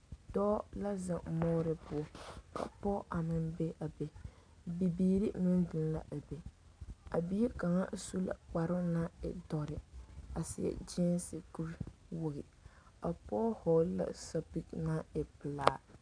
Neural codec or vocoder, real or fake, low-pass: none; real; 9.9 kHz